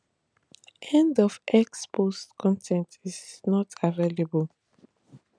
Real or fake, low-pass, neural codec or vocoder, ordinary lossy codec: real; 9.9 kHz; none; none